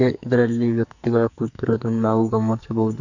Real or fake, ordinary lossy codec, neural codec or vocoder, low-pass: fake; none; codec, 44.1 kHz, 2.6 kbps, SNAC; 7.2 kHz